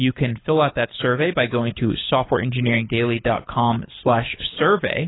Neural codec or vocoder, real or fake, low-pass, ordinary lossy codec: codec, 24 kHz, 6 kbps, HILCodec; fake; 7.2 kHz; AAC, 16 kbps